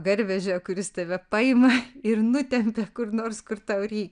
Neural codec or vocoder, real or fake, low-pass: none; real; 9.9 kHz